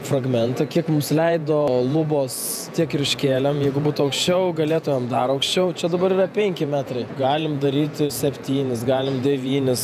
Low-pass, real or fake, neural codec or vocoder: 14.4 kHz; fake; vocoder, 48 kHz, 128 mel bands, Vocos